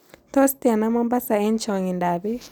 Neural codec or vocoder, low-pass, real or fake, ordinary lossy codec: none; none; real; none